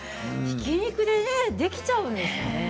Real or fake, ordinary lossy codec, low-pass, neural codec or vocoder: real; none; none; none